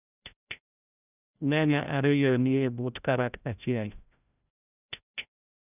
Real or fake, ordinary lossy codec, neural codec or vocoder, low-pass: fake; none; codec, 16 kHz, 0.5 kbps, FreqCodec, larger model; 3.6 kHz